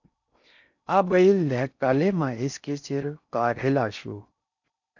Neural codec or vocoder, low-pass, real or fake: codec, 16 kHz in and 24 kHz out, 0.6 kbps, FocalCodec, streaming, 4096 codes; 7.2 kHz; fake